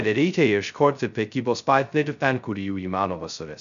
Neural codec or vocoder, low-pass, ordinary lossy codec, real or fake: codec, 16 kHz, 0.2 kbps, FocalCodec; 7.2 kHz; MP3, 96 kbps; fake